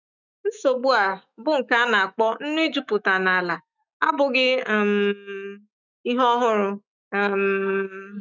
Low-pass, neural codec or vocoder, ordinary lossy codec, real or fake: 7.2 kHz; autoencoder, 48 kHz, 128 numbers a frame, DAC-VAE, trained on Japanese speech; none; fake